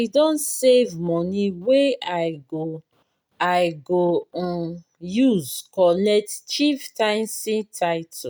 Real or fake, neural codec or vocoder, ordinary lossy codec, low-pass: real; none; none; none